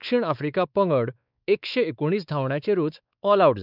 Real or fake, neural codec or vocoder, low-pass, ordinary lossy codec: fake; codec, 16 kHz, 4 kbps, X-Codec, WavLM features, trained on Multilingual LibriSpeech; 5.4 kHz; none